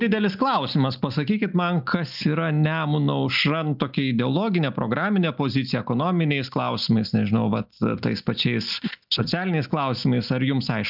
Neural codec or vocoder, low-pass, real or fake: none; 5.4 kHz; real